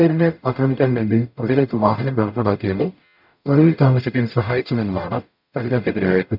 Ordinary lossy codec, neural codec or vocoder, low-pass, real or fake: none; codec, 44.1 kHz, 0.9 kbps, DAC; 5.4 kHz; fake